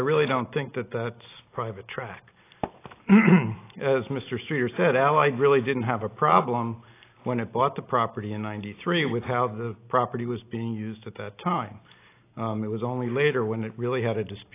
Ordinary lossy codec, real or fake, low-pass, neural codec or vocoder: AAC, 24 kbps; real; 3.6 kHz; none